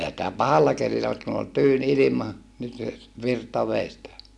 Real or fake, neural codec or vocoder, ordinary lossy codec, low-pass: real; none; none; none